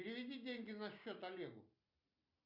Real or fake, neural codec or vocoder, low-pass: real; none; 5.4 kHz